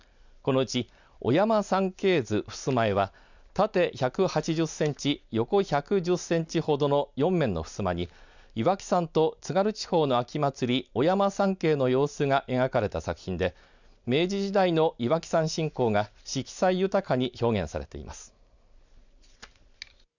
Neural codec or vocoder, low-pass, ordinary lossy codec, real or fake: none; 7.2 kHz; none; real